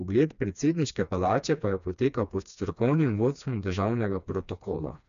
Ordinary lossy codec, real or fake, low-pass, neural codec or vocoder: none; fake; 7.2 kHz; codec, 16 kHz, 2 kbps, FreqCodec, smaller model